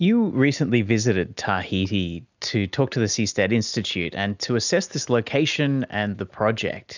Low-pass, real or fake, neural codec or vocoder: 7.2 kHz; real; none